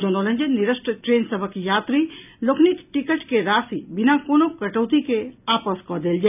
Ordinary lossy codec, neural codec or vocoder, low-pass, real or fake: none; none; 3.6 kHz; real